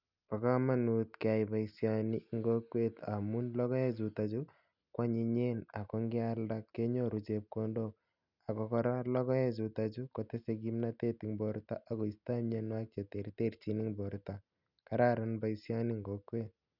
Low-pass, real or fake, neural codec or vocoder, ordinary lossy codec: 5.4 kHz; real; none; none